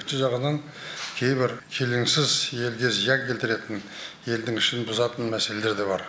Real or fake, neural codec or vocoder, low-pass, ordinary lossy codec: real; none; none; none